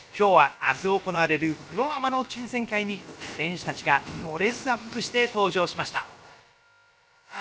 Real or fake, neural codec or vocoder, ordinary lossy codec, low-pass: fake; codec, 16 kHz, about 1 kbps, DyCAST, with the encoder's durations; none; none